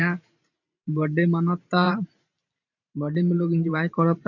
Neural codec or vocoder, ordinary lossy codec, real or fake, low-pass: vocoder, 44.1 kHz, 128 mel bands every 512 samples, BigVGAN v2; none; fake; 7.2 kHz